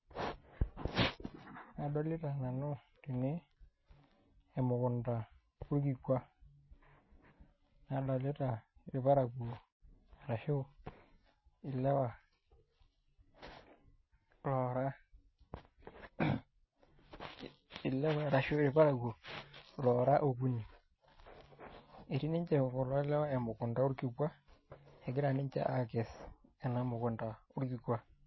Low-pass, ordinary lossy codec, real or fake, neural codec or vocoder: 7.2 kHz; MP3, 24 kbps; real; none